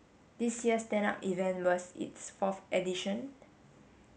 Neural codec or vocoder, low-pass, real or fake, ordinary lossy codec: none; none; real; none